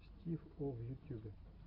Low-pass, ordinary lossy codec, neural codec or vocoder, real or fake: 5.4 kHz; AAC, 32 kbps; none; real